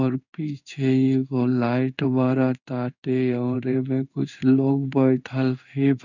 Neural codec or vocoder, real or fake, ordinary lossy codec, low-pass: codec, 16 kHz in and 24 kHz out, 1 kbps, XY-Tokenizer; fake; none; 7.2 kHz